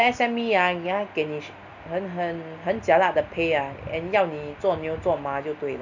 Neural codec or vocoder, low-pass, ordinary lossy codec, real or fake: none; 7.2 kHz; none; real